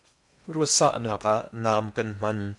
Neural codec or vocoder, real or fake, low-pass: codec, 16 kHz in and 24 kHz out, 0.8 kbps, FocalCodec, streaming, 65536 codes; fake; 10.8 kHz